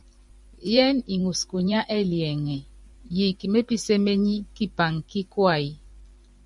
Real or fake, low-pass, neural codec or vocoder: fake; 10.8 kHz; vocoder, 44.1 kHz, 128 mel bands every 512 samples, BigVGAN v2